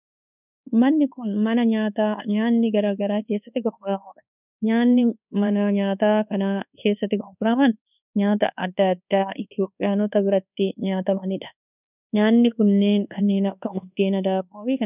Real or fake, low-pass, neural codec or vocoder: fake; 3.6 kHz; codec, 24 kHz, 1.2 kbps, DualCodec